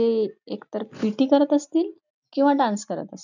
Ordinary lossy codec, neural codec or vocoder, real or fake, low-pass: none; none; real; 7.2 kHz